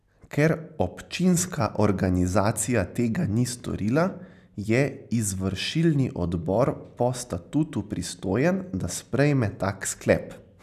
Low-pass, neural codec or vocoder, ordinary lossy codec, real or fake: 14.4 kHz; none; none; real